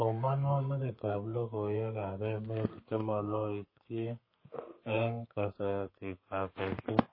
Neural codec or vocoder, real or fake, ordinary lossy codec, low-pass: codec, 32 kHz, 1.9 kbps, SNAC; fake; MP3, 24 kbps; 5.4 kHz